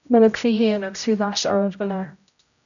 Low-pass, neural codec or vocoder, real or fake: 7.2 kHz; codec, 16 kHz, 0.5 kbps, X-Codec, HuBERT features, trained on general audio; fake